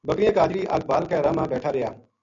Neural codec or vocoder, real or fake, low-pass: none; real; 7.2 kHz